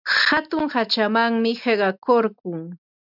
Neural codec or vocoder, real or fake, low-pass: none; real; 5.4 kHz